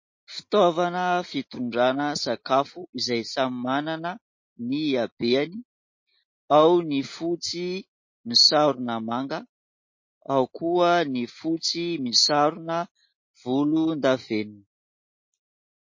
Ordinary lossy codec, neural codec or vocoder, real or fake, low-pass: MP3, 32 kbps; none; real; 7.2 kHz